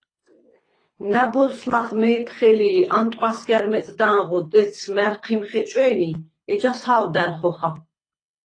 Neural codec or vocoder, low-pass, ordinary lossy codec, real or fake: codec, 24 kHz, 3 kbps, HILCodec; 9.9 kHz; AAC, 32 kbps; fake